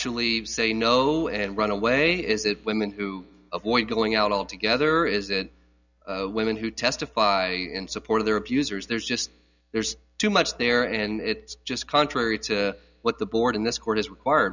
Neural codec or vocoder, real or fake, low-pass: none; real; 7.2 kHz